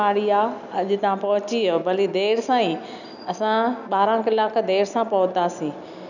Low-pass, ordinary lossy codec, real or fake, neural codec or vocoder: 7.2 kHz; none; real; none